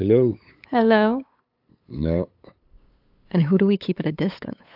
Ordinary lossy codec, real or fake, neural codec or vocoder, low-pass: AAC, 48 kbps; fake; codec, 16 kHz, 8 kbps, FunCodec, trained on LibriTTS, 25 frames a second; 5.4 kHz